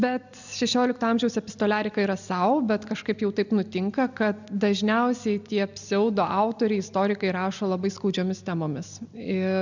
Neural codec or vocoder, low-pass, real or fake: none; 7.2 kHz; real